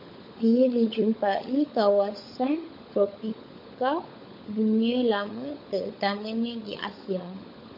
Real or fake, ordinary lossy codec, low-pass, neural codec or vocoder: fake; MP3, 32 kbps; 5.4 kHz; codec, 16 kHz, 16 kbps, FunCodec, trained on LibriTTS, 50 frames a second